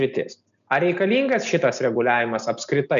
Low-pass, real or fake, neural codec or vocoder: 7.2 kHz; real; none